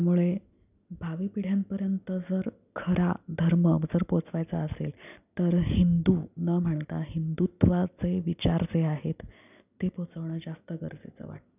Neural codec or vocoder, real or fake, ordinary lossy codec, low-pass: none; real; none; 3.6 kHz